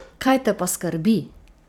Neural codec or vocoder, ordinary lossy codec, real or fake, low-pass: vocoder, 44.1 kHz, 128 mel bands every 256 samples, BigVGAN v2; none; fake; 19.8 kHz